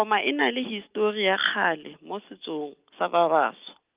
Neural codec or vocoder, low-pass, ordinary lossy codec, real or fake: none; 3.6 kHz; Opus, 24 kbps; real